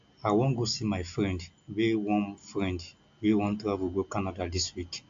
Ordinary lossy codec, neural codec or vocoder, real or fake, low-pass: AAC, 64 kbps; none; real; 7.2 kHz